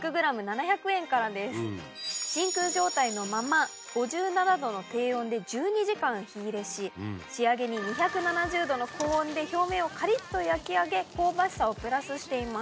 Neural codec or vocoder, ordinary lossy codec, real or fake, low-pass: none; none; real; none